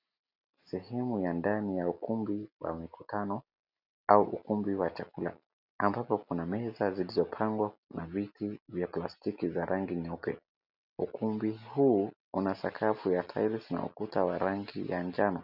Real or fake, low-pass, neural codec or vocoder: real; 5.4 kHz; none